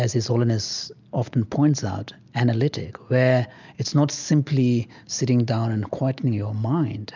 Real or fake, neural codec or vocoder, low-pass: real; none; 7.2 kHz